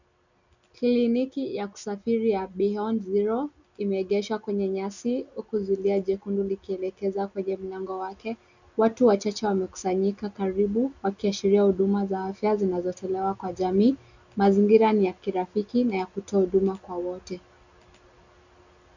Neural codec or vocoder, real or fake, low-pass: none; real; 7.2 kHz